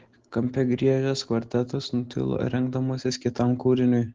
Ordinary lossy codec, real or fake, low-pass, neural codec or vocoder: Opus, 16 kbps; real; 7.2 kHz; none